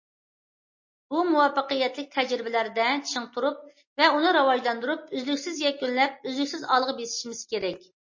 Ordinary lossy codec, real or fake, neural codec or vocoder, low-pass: MP3, 32 kbps; real; none; 7.2 kHz